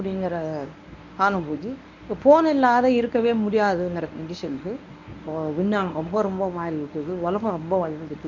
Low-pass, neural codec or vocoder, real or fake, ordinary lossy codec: 7.2 kHz; codec, 24 kHz, 0.9 kbps, WavTokenizer, medium speech release version 1; fake; none